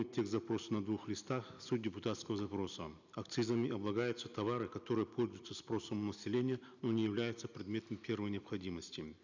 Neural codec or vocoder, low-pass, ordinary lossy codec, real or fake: none; 7.2 kHz; none; real